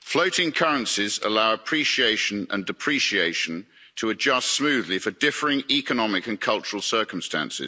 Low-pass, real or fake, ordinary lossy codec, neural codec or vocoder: none; real; none; none